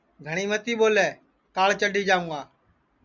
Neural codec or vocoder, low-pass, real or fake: none; 7.2 kHz; real